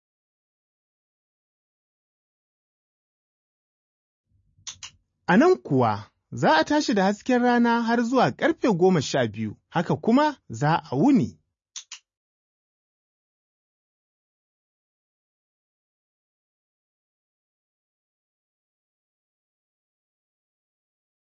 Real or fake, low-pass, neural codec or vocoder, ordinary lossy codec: real; 7.2 kHz; none; MP3, 32 kbps